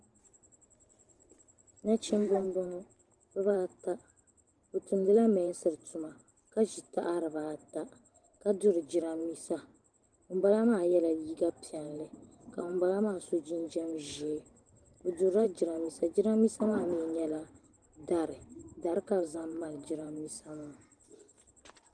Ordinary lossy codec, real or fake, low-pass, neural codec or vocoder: Opus, 24 kbps; fake; 9.9 kHz; vocoder, 44.1 kHz, 128 mel bands every 512 samples, BigVGAN v2